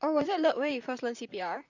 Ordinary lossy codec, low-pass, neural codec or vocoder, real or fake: none; 7.2 kHz; vocoder, 44.1 kHz, 128 mel bands, Pupu-Vocoder; fake